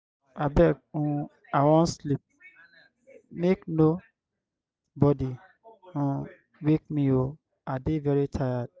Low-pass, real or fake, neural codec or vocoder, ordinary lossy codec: none; real; none; none